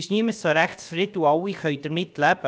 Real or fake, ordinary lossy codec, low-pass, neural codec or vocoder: fake; none; none; codec, 16 kHz, about 1 kbps, DyCAST, with the encoder's durations